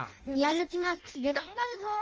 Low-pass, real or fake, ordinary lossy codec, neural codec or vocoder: 7.2 kHz; fake; Opus, 24 kbps; codec, 16 kHz in and 24 kHz out, 0.6 kbps, FireRedTTS-2 codec